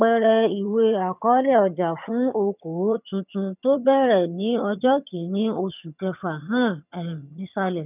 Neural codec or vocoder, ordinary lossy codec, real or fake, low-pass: vocoder, 22.05 kHz, 80 mel bands, HiFi-GAN; none; fake; 3.6 kHz